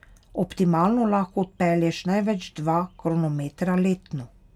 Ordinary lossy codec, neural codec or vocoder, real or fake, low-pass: none; none; real; 19.8 kHz